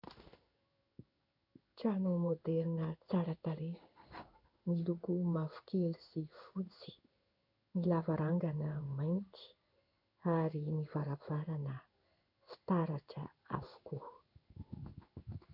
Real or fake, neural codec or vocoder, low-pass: fake; codec, 16 kHz in and 24 kHz out, 1 kbps, XY-Tokenizer; 5.4 kHz